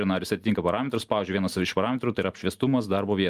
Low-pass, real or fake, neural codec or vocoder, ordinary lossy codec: 14.4 kHz; real; none; Opus, 32 kbps